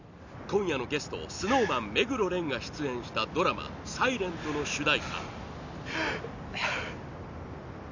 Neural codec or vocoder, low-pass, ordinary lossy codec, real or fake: none; 7.2 kHz; none; real